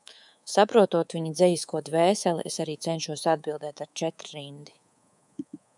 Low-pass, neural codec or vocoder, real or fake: 10.8 kHz; codec, 24 kHz, 3.1 kbps, DualCodec; fake